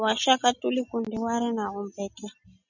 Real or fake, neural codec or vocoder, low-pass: real; none; 7.2 kHz